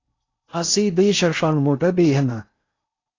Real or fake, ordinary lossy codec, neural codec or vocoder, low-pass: fake; AAC, 32 kbps; codec, 16 kHz in and 24 kHz out, 0.6 kbps, FocalCodec, streaming, 4096 codes; 7.2 kHz